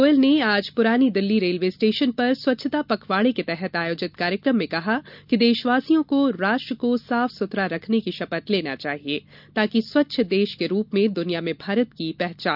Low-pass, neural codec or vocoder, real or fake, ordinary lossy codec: 5.4 kHz; none; real; none